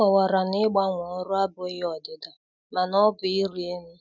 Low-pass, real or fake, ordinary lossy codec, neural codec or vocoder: 7.2 kHz; real; none; none